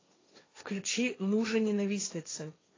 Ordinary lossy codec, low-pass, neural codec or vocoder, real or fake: AAC, 32 kbps; 7.2 kHz; codec, 16 kHz, 1.1 kbps, Voila-Tokenizer; fake